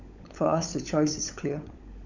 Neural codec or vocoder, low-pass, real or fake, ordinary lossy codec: codec, 16 kHz, 16 kbps, FunCodec, trained on LibriTTS, 50 frames a second; 7.2 kHz; fake; none